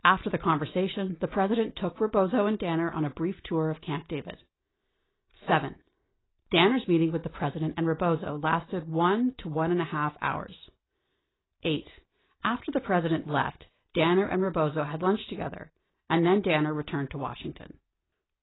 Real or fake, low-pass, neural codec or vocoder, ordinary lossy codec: real; 7.2 kHz; none; AAC, 16 kbps